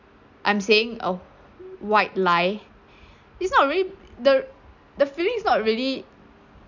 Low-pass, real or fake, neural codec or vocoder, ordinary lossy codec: 7.2 kHz; real; none; none